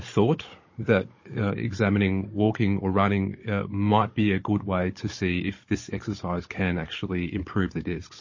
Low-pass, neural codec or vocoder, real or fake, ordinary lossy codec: 7.2 kHz; codec, 16 kHz, 16 kbps, FunCodec, trained on Chinese and English, 50 frames a second; fake; MP3, 32 kbps